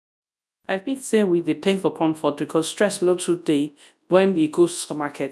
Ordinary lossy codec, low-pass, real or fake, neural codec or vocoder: none; none; fake; codec, 24 kHz, 0.9 kbps, WavTokenizer, large speech release